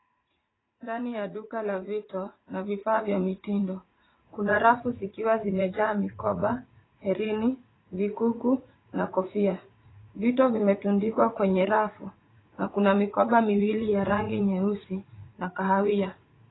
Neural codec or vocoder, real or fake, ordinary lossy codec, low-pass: vocoder, 44.1 kHz, 80 mel bands, Vocos; fake; AAC, 16 kbps; 7.2 kHz